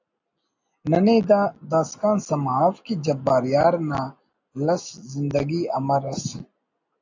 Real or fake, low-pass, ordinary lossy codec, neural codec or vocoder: real; 7.2 kHz; AAC, 48 kbps; none